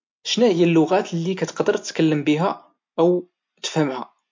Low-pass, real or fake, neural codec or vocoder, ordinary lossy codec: 7.2 kHz; real; none; MP3, 48 kbps